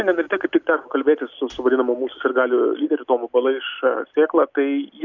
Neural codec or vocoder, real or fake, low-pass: none; real; 7.2 kHz